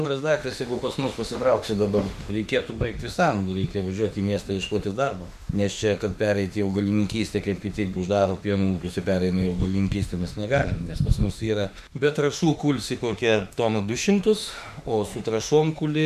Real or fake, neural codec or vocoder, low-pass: fake; autoencoder, 48 kHz, 32 numbers a frame, DAC-VAE, trained on Japanese speech; 14.4 kHz